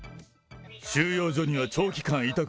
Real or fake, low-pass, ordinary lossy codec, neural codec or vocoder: real; none; none; none